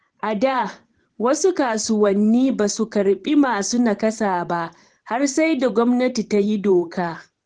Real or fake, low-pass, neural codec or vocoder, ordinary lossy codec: fake; 9.9 kHz; vocoder, 44.1 kHz, 128 mel bands every 512 samples, BigVGAN v2; Opus, 16 kbps